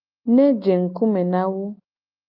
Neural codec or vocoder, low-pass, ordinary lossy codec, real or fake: none; 5.4 kHz; Opus, 24 kbps; real